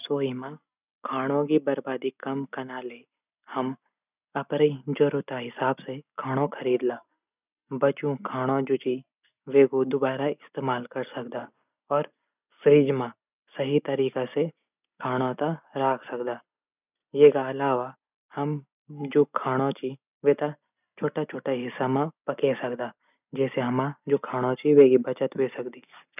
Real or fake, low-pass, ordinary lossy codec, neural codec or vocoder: real; 3.6 kHz; none; none